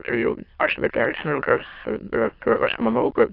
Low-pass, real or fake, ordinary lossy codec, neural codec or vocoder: 5.4 kHz; fake; AAC, 32 kbps; autoencoder, 22.05 kHz, a latent of 192 numbers a frame, VITS, trained on many speakers